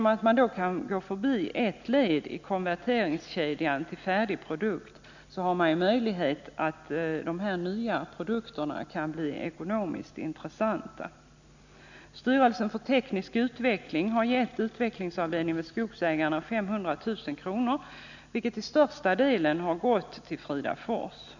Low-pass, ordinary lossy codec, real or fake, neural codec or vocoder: 7.2 kHz; none; real; none